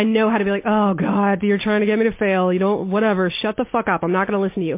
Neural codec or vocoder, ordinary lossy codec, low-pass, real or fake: none; MP3, 24 kbps; 3.6 kHz; real